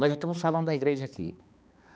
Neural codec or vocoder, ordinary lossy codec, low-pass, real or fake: codec, 16 kHz, 2 kbps, X-Codec, HuBERT features, trained on balanced general audio; none; none; fake